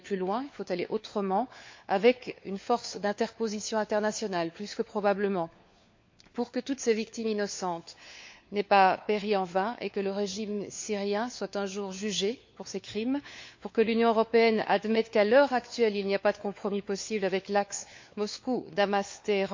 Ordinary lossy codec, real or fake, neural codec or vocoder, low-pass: MP3, 48 kbps; fake; codec, 16 kHz, 4 kbps, FunCodec, trained on LibriTTS, 50 frames a second; 7.2 kHz